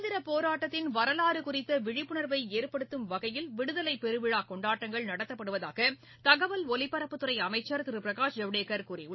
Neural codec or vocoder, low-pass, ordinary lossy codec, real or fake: none; 7.2 kHz; MP3, 24 kbps; real